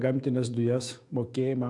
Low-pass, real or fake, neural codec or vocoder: 10.8 kHz; fake; autoencoder, 48 kHz, 128 numbers a frame, DAC-VAE, trained on Japanese speech